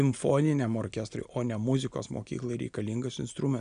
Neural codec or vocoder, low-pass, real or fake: none; 9.9 kHz; real